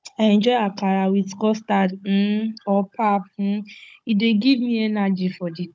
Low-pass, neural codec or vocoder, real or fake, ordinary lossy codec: none; codec, 16 kHz, 16 kbps, FunCodec, trained on Chinese and English, 50 frames a second; fake; none